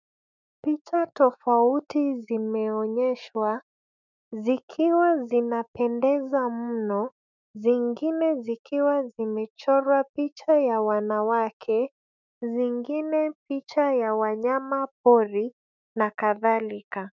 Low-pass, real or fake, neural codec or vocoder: 7.2 kHz; fake; autoencoder, 48 kHz, 128 numbers a frame, DAC-VAE, trained on Japanese speech